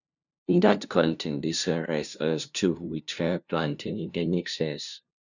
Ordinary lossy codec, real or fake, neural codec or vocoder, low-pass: none; fake; codec, 16 kHz, 0.5 kbps, FunCodec, trained on LibriTTS, 25 frames a second; 7.2 kHz